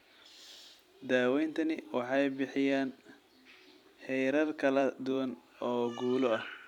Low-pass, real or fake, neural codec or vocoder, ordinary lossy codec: 19.8 kHz; fake; vocoder, 44.1 kHz, 128 mel bands every 256 samples, BigVGAN v2; none